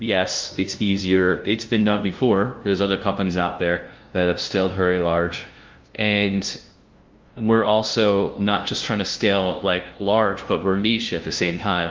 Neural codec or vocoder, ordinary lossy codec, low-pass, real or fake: codec, 16 kHz, 0.5 kbps, FunCodec, trained on LibriTTS, 25 frames a second; Opus, 32 kbps; 7.2 kHz; fake